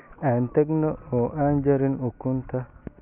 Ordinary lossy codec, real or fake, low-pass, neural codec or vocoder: none; real; 3.6 kHz; none